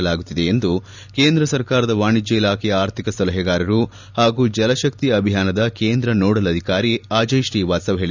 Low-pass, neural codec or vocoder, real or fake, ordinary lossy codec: 7.2 kHz; none; real; none